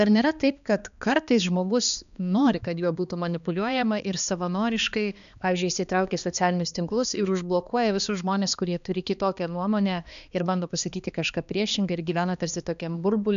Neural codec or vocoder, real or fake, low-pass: codec, 16 kHz, 2 kbps, X-Codec, HuBERT features, trained on balanced general audio; fake; 7.2 kHz